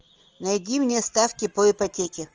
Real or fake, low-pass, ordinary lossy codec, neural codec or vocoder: real; 7.2 kHz; Opus, 24 kbps; none